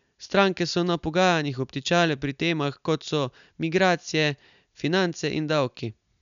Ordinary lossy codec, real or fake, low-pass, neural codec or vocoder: none; real; 7.2 kHz; none